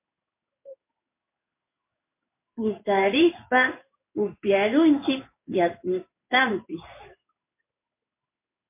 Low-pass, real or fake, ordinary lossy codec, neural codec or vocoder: 3.6 kHz; fake; MP3, 24 kbps; codec, 16 kHz in and 24 kHz out, 1 kbps, XY-Tokenizer